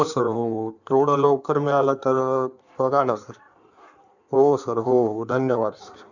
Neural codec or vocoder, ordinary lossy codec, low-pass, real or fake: codec, 16 kHz in and 24 kHz out, 1.1 kbps, FireRedTTS-2 codec; none; 7.2 kHz; fake